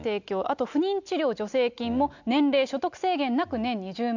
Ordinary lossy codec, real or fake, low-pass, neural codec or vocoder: none; real; 7.2 kHz; none